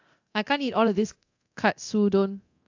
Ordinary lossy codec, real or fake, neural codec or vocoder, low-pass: AAC, 48 kbps; fake; codec, 24 kHz, 0.9 kbps, DualCodec; 7.2 kHz